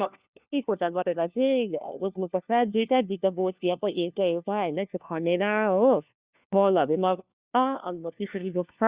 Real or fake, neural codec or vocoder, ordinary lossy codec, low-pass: fake; codec, 16 kHz, 1 kbps, FunCodec, trained on LibriTTS, 50 frames a second; Opus, 64 kbps; 3.6 kHz